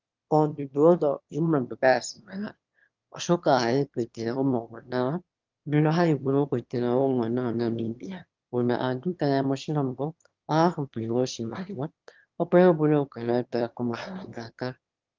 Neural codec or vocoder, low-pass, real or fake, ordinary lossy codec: autoencoder, 22.05 kHz, a latent of 192 numbers a frame, VITS, trained on one speaker; 7.2 kHz; fake; Opus, 32 kbps